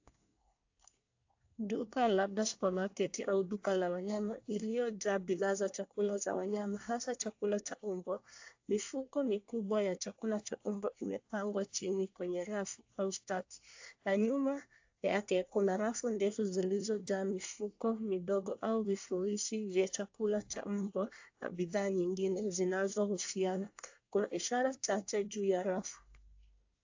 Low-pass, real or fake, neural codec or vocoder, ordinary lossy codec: 7.2 kHz; fake; codec, 24 kHz, 1 kbps, SNAC; AAC, 48 kbps